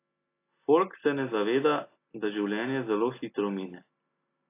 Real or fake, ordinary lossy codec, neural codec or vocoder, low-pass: real; AAC, 24 kbps; none; 3.6 kHz